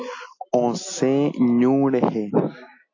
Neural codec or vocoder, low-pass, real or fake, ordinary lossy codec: none; 7.2 kHz; real; AAC, 48 kbps